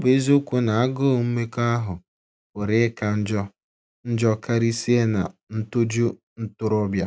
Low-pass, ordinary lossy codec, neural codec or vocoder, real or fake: none; none; none; real